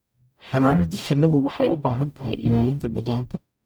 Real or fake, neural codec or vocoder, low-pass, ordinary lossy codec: fake; codec, 44.1 kHz, 0.9 kbps, DAC; none; none